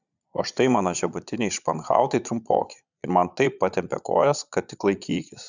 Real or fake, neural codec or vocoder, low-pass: fake; vocoder, 22.05 kHz, 80 mel bands, Vocos; 7.2 kHz